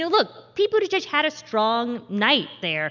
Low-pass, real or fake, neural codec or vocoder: 7.2 kHz; real; none